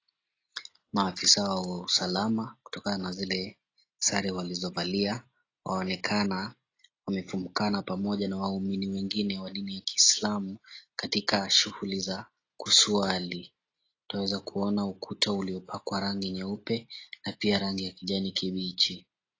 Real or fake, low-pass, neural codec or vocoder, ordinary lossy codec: real; 7.2 kHz; none; AAC, 32 kbps